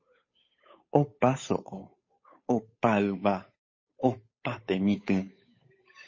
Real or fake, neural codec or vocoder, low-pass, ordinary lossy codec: fake; codec, 16 kHz, 8 kbps, FunCodec, trained on Chinese and English, 25 frames a second; 7.2 kHz; MP3, 32 kbps